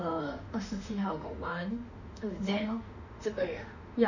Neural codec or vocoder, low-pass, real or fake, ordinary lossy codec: autoencoder, 48 kHz, 32 numbers a frame, DAC-VAE, trained on Japanese speech; 7.2 kHz; fake; none